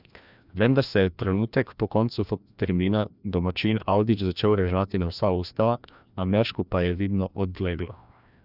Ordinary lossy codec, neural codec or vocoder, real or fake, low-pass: none; codec, 16 kHz, 1 kbps, FreqCodec, larger model; fake; 5.4 kHz